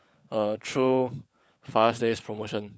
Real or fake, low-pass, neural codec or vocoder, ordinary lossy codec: fake; none; codec, 16 kHz, 16 kbps, FunCodec, trained on LibriTTS, 50 frames a second; none